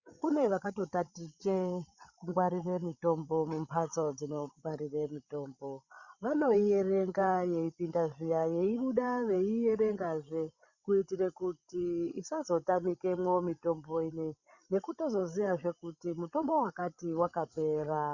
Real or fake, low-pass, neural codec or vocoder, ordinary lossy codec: fake; 7.2 kHz; codec, 16 kHz, 16 kbps, FreqCodec, larger model; Opus, 64 kbps